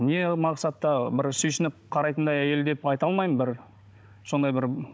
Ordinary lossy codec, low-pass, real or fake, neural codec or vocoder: none; none; fake; codec, 16 kHz, 16 kbps, FunCodec, trained on Chinese and English, 50 frames a second